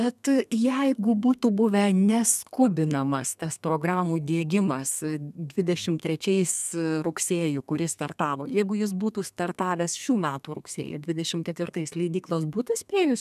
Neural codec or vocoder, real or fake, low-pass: codec, 32 kHz, 1.9 kbps, SNAC; fake; 14.4 kHz